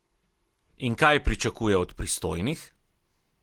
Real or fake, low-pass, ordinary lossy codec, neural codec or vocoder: real; 19.8 kHz; Opus, 16 kbps; none